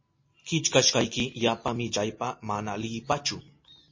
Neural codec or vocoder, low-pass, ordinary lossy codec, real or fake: none; 7.2 kHz; MP3, 32 kbps; real